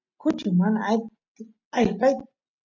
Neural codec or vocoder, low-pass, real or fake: none; 7.2 kHz; real